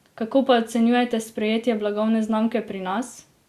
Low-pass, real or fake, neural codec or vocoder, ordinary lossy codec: 14.4 kHz; real; none; Opus, 64 kbps